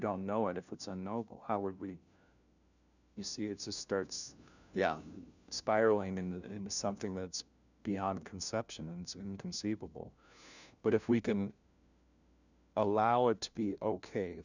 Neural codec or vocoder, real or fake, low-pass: codec, 16 kHz, 1 kbps, FunCodec, trained on LibriTTS, 50 frames a second; fake; 7.2 kHz